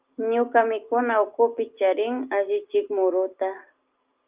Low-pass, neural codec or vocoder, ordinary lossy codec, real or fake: 3.6 kHz; none; Opus, 24 kbps; real